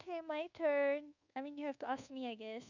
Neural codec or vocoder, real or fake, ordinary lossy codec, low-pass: codec, 24 kHz, 1.2 kbps, DualCodec; fake; none; 7.2 kHz